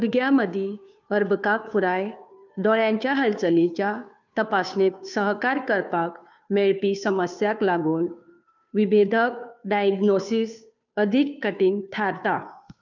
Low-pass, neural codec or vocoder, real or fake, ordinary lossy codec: 7.2 kHz; codec, 16 kHz, 2 kbps, FunCodec, trained on Chinese and English, 25 frames a second; fake; none